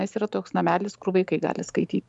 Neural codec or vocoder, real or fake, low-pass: vocoder, 44.1 kHz, 128 mel bands every 512 samples, BigVGAN v2; fake; 10.8 kHz